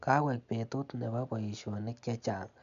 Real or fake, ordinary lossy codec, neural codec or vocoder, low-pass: real; none; none; 7.2 kHz